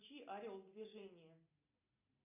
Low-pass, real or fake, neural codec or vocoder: 3.6 kHz; real; none